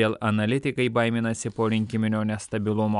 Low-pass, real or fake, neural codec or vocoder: 10.8 kHz; real; none